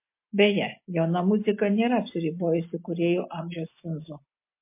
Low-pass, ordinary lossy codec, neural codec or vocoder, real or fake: 3.6 kHz; MP3, 24 kbps; none; real